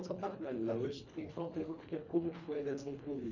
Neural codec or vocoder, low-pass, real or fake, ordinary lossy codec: codec, 24 kHz, 1.5 kbps, HILCodec; 7.2 kHz; fake; none